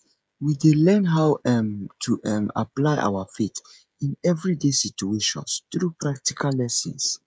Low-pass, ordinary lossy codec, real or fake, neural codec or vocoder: none; none; fake; codec, 16 kHz, 16 kbps, FreqCodec, smaller model